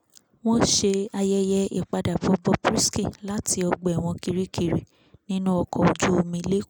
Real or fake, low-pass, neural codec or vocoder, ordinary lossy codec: real; none; none; none